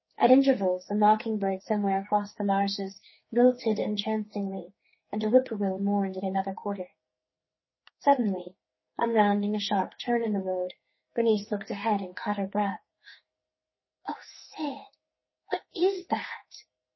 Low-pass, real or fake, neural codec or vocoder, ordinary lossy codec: 7.2 kHz; fake; codec, 44.1 kHz, 2.6 kbps, SNAC; MP3, 24 kbps